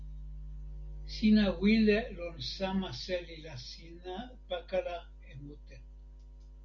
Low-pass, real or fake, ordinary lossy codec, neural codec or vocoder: 7.2 kHz; real; Opus, 64 kbps; none